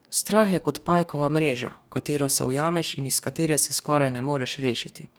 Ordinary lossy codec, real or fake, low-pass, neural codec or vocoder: none; fake; none; codec, 44.1 kHz, 2.6 kbps, DAC